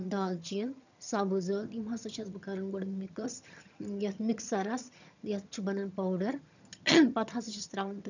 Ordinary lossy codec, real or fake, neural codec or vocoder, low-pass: none; fake; vocoder, 22.05 kHz, 80 mel bands, HiFi-GAN; 7.2 kHz